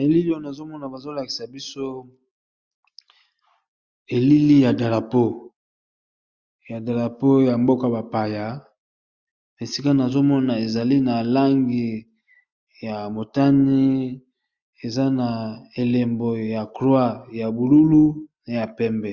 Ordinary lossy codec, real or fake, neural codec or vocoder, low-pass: Opus, 64 kbps; real; none; 7.2 kHz